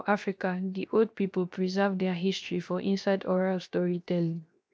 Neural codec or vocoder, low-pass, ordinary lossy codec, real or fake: codec, 16 kHz, 0.7 kbps, FocalCodec; none; none; fake